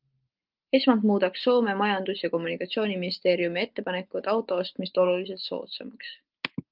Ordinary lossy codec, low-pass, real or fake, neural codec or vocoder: Opus, 32 kbps; 5.4 kHz; real; none